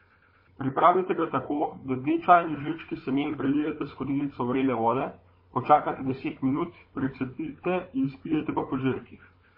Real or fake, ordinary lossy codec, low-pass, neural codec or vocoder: fake; MP3, 24 kbps; 5.4 kHz; codec, 16 kHz, 4 kbps, FunCodec, trained on Chinese and English, 50 frames a second